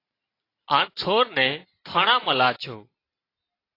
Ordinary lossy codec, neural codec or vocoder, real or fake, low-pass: AAC, 32 kbps; none; real; 5.4 kHz